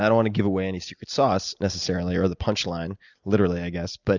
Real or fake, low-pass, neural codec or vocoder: real; 7.2 kHz; none